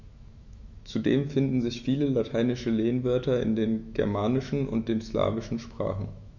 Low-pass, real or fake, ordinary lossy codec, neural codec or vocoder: 7.2 kHz; fake; none; autoencoder, 48 kHz, 128 numbers a frame, DAC-VAE, trained on Japanese speech